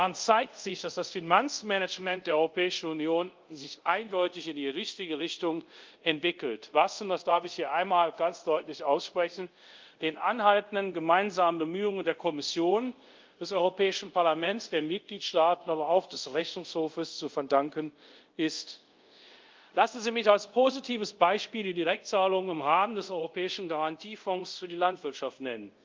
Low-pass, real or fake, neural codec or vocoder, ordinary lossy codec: 7.2 kHz; fake; codec, 24 kHz, 0.5 kbps, DualCodec; Opus, 24 kbps